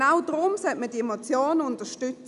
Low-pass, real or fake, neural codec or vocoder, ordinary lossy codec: 10.8 kHz; real; none; none